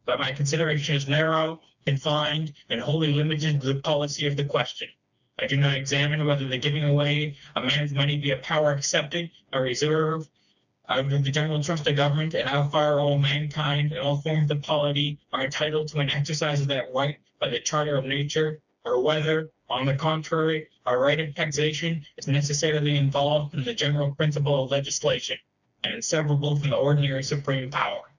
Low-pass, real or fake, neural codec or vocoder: 7.2 kHz; fake; codec, 16 kHz, 2 kbps, FreqCodec, smaller model